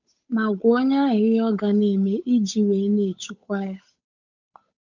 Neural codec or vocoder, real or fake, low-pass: codec, 16 kHz, 8 kbps, FunCodec, trained on Chinese and English, 25 frames a second; fake; 7.2 kHz